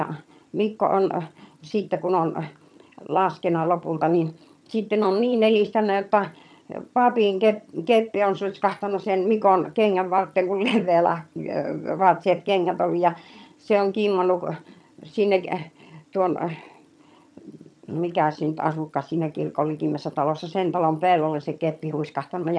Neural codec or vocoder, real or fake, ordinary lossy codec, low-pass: vocoder, 22.05 kHz, 80 mel bands, HiFi-GAN; fake; none; none